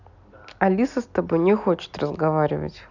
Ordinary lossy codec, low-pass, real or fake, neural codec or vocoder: none; 7.2 kHz; real; none